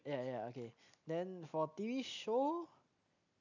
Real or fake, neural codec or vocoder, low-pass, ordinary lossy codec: real; none; 7.2 kHz; none